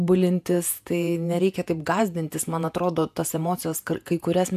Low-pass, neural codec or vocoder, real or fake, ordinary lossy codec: 14.4 kHz; vocoder, 48 kHz, 128 mel bands, Vocos; fake; AAC, 96 kbps